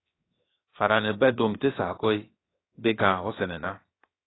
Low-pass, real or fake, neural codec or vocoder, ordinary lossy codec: 7.2 kHz; fake; codec, 16 kHz, 0.7 kbps, FocalCodec; AAC, 16 kbps